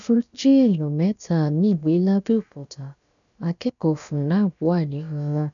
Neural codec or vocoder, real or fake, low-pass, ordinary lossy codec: codec, 16 kHz, about 1 kbps, DyCAST, with the encoder's durations; fake; 7.2 kHz; none